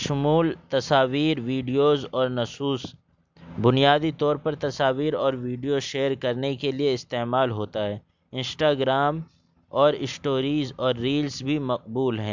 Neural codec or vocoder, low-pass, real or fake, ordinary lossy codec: none; 7.2 kHz; real; MP3, 64 kbps